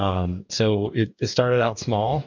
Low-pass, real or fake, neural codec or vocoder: 7.2 kHz; fake; codec, 44.1 kHz, 2.6 kbps, DAC